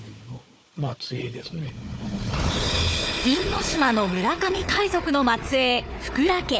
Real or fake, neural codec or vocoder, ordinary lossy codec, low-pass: fake; codec, 16 kHz, 4 kbps, FunCodec, trained on Chinese and English, 50 frames a second; none; none